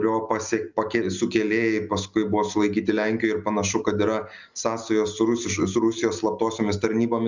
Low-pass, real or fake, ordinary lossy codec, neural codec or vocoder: 7.2 kHz; real; Opus, 64 kbps; none